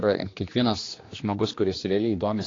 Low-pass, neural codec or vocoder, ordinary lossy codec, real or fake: 7.2 kHz; codec, 16 kHz, 2 kbps, X-Codec, HuBERT features, trained on general audio; AAC, 32 kbps; fake